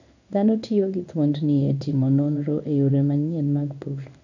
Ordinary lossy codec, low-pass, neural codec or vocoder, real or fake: none; 7.2 kHz; codec, 16 kHz in and 24 kHz out, 1 kbps, XY-Tokenizer; fake